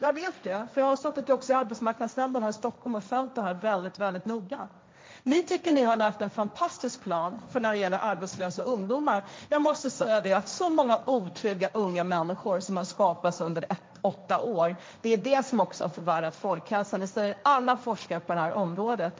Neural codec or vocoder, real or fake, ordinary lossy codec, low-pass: codec, 16 kHz, 1.1 kbps, Voila-Tokenizer; fake; none; none